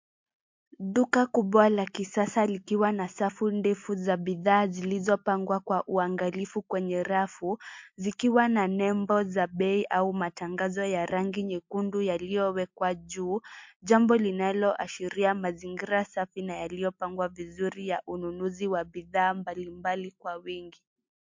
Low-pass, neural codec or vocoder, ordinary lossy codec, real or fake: 7.2 kHz; none; MP3, 48 kbps; real